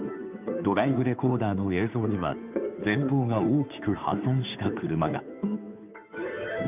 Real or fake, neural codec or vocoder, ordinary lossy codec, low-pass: fake; codec, 16 kHz, 2 kbps, FunCodec, trained on Chinese and English, 25 frames a second; none; 3.6 kHz